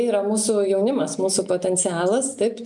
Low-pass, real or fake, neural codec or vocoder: 10.8 kHz; real; none